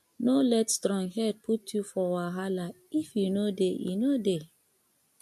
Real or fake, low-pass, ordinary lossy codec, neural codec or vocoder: real; 14.4 kHz; MP3, 64 kbps; none